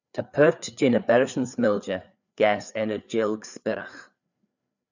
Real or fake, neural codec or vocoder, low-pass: fake; codec, 16 kHz, 4 kbps, FreqCodec, larger model; 7.2 kHz